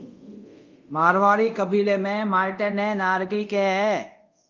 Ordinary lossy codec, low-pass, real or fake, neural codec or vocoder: Opus, 24 kbps; 7.2 kHz; fake; codec, 24 kHz, 0.5 kbps, DualCodec